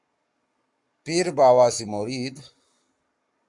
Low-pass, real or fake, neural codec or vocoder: 10.8 kHz; fake; codec, 44.1 kHz, 7.8 kbps, Pupu-Codec